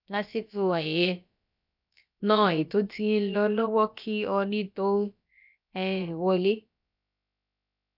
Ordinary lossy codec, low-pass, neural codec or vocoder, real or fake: none; 5.4 kHz; codec, 16 kHz, about 1 kbps, DyCAST, with the encoder's durations; fake